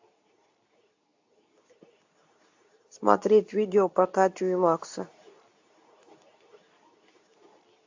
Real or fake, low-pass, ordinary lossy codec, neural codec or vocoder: fake; 7.2 kHz; AAC, 48 kbps; codec, 24 kHz, 0.9 kbps, WavTokenizer, medium speech release version 2